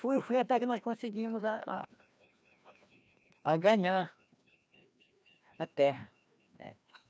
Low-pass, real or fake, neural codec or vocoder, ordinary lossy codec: none; fake; codec, 16 kHz, 1 kbps, FreqCodec, larger model; none